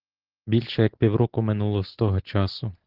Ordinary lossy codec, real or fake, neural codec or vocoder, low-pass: Opus, 16 kbps; real; none; 5.4 kHz